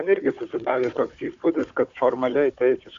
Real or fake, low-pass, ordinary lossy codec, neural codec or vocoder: fake; 7.2 kHz; AAC, 64 kbps; codec, 16 kHz, 4 kbps, FunCodec, trained on Chinese and English, 50 frames a second